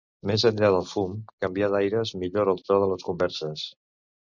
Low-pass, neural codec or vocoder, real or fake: 7.2 kHz; none; real